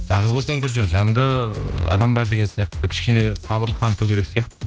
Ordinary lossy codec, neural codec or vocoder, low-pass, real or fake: none; codec, 16 kHz, 1 kbps, X-Codec, HuBERT features, trained on general audio; none; fake